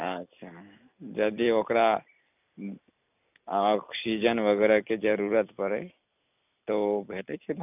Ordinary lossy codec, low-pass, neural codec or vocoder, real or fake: none; 3.6 kHz; none; real